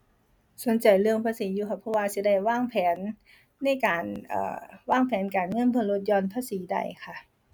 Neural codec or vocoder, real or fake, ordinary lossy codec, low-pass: none; real; none; 19.8 kHz